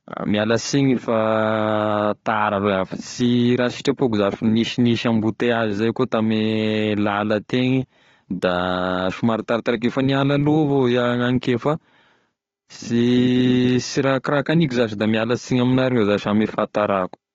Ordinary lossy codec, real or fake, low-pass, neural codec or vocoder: AAC, 32 kbps; fake; 7.2 kHz; codec, 16 kHz, 4 kbps, FunCodec, trained on Chinese and English, 50 frames a second